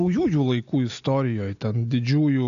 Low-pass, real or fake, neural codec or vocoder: 7.2 kHz; real; none